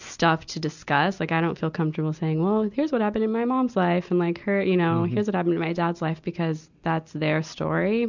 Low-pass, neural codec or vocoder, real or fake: 7.2 kHz; none; real